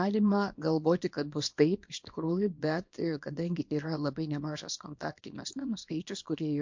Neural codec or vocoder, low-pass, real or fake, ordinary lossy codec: codec, 24 kHz, 0.9 kbps, WavTokenizer, small release; 7.2 kHz; fake; MP3, 48 kbps